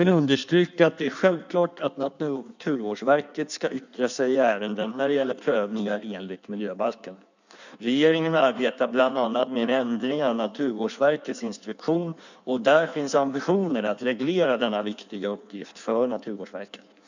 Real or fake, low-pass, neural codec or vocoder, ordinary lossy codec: fake; 7.2 kHz; codec, 16 kHz in and 24 kHz out, 1.1 kbps, FireRedTTS-2 codec; none